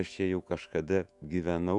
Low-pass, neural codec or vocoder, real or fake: 10.8 kHz; autoencoder, 48 kHz, 128 numbers a frame, DAC-VAE, trained on Japanese speech; fake